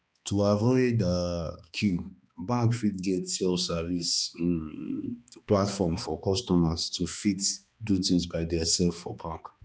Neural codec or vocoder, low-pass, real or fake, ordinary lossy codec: codec, 16 kHz, 2 kbps, X-Codec, HuBERT features, trained on balanced general audio; none; fake; none